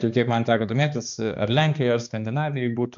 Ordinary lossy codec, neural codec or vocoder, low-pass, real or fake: MP3, 64 kbps; codec, 16 kHz, 2 kbps, X-Codec, HuBERT features, trained on balanced general audio; 7.2 kHz; fake